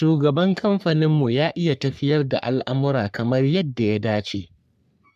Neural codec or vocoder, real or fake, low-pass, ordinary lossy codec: codec, 44.1 kHz, 3.4 kbps, Pupu-Codec; fake; 14.4 kHz; none